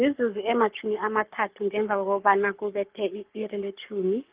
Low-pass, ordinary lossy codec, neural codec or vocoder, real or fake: 3.6 kHz; Opus, 32 kbps; codec, 44.1 kHz, 7.8 kbps, Pupu-Codec; fake